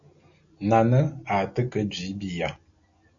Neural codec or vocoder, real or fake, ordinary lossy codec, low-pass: none; real; MP3, 96 kbps; 7.2 kHz